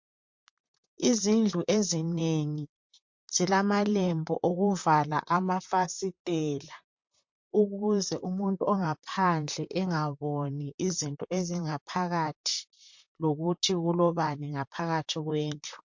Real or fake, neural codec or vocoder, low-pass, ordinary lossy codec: fake; vocoder, 44.1 kHz, 128 mel bands, Pupu-Vocoder; 7.2 kHz; MP3, 48 kbps